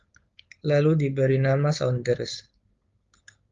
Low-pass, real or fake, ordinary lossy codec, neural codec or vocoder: 7.2 kHz; fake; Opus, 32 kbps; codec, 16 kHz, 4.8 kbps, FACodec